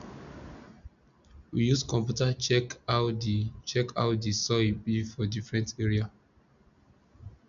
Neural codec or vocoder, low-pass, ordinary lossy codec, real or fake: none; 7.2 kHz; none; real